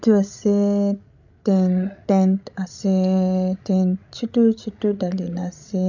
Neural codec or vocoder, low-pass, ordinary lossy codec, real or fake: codec, 16 kHz, 16 kbps, FreqCodec, larger model; 7.2 kHz; none; fake